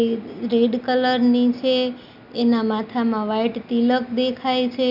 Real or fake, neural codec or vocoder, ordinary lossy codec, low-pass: real; none; MP3, 48 kbps; 5.4 kHz